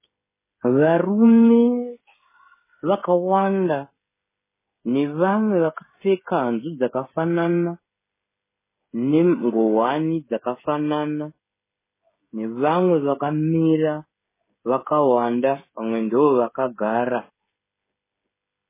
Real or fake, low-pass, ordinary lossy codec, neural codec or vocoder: fake; 3.6 kHz; MP3, 16 kbps; codec, 16 kHz, 16 kbps, FreqCodec, smaller model